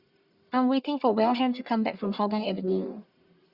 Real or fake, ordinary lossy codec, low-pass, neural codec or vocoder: fake; Opus, 64 kbps; 5.4 kHz; codec, 44.1 kHz, 1.7 kbps, Pupu-Codec